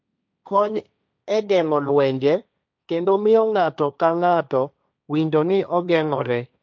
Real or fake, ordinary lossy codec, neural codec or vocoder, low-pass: fake; none; codec, 16 kHz, 1.1 kbps, Voila-Tokenizer; none